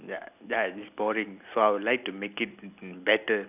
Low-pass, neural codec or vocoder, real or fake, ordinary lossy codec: 3.6 kHz; none; real; none